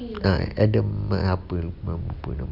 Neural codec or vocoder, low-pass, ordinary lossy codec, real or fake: none; 5.4 kHz; none; real